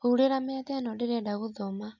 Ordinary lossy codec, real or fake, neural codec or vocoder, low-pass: none; real; none; 7.2 kHz